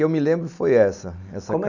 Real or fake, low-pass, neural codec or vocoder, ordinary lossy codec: real; 7.2 kHz; none; none